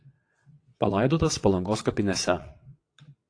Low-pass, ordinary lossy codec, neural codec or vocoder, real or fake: 9.9 kHz; AAC, 48 kbps; vocoder, 22.05 kHz, 80 mel bands, WaveNeXt; fake